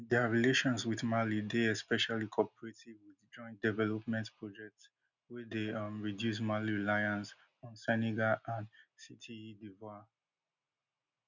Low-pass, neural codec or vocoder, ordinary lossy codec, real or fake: 7.2 kHz; none; none; real